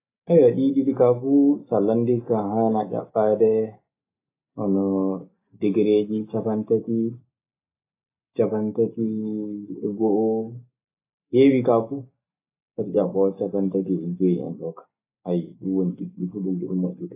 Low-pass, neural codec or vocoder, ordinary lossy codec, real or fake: 3.6 kHz; none; AAC, 24 kbps; real